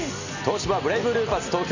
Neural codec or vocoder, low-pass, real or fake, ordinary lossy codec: none; 7.2 kHz; real; none